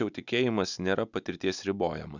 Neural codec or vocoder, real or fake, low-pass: none; real; 7.2 kHz